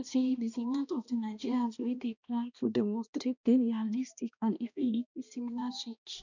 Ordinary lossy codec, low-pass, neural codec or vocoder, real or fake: none; 7.2 kHz; codec, 16 kHz, 1 kbps, X-Codec, HuBERT features, trained on balanced general audio; fake